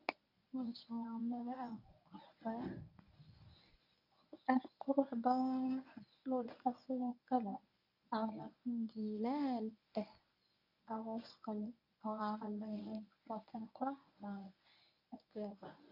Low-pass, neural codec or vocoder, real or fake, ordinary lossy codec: 5.4 kHz; codec, 24 kHz, 0.9 kbps, WavTokenizer, medium speech release version 1; fake; none